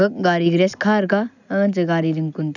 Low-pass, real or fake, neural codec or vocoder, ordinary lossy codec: 7.2 kHz; real; none; none